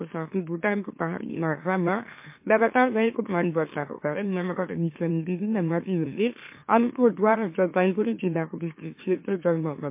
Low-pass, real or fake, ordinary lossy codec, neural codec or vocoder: 3.6 kHz; fake; MP3, 24 kbps; autoencoder, 44.1 kHz, a latent of 192 numbers a frame, MeloTTS